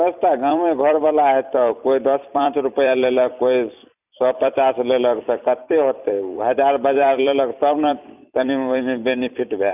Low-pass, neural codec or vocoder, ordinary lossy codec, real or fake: 3.6 kHz; vocoder, 44.1 kHz, 128 mel bands every 256 samples, BigVGAN v2; none; fake